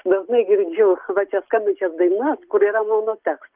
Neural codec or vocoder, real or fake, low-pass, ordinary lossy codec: none; real; 3.6 kHz; Opus, 32 kbps